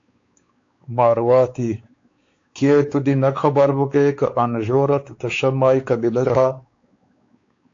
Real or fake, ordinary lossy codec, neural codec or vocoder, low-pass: fake; AAC, 48 kbps; codec, 16 kHz, 4 kbps, X-Codec, WavLM features, trained on Multilingual LibriSpeech; 7.2 kHz